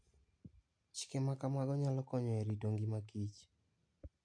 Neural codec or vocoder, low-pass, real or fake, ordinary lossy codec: none; 9.9 kHz; real; MP3, 48 kbps